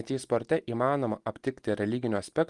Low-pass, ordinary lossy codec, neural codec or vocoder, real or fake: 10.8 kHz; Opus, 24 kbps; none; real